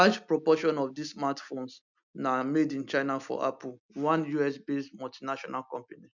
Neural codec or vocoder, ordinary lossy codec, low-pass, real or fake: none; none; 7.2 kHz; real